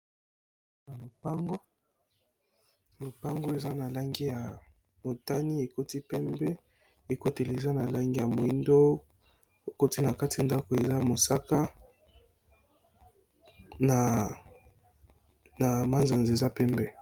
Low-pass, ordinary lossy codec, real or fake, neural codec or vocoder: 19.8 kHz; Opus, 32 kbps; real; none